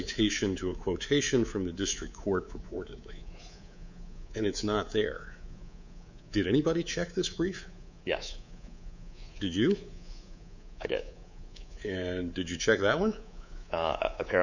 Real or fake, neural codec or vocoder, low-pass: fake; codec, 24 kHz, 3.1 kbps, DualCodec; 7.2 kHz